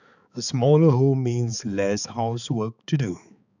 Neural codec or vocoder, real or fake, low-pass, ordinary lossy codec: codec, 16 kHz, 4 kbps, X-Codec, HuBERT features, trained on balanced general audio; fake; 7.2 kHz; none